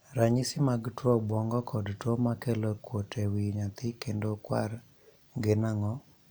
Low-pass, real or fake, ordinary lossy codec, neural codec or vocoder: none; real; none; none